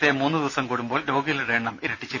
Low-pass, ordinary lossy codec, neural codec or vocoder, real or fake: none; none; none; real